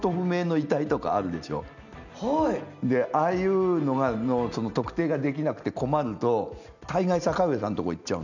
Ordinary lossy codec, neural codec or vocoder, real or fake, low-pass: none; none; real; 7.2 kHz